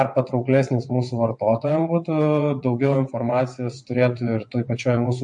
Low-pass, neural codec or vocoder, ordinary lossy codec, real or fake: 9.9 kHz; vocoder, 22.05 kHz, 80 mel bands, WaveNeXt; MP3, 48 kbps; fake